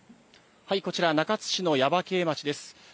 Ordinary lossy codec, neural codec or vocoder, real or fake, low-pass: none; none; real; none